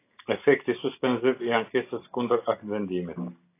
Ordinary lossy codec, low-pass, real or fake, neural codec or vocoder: AAC, 24 kbps; 3.6 kHz; real; none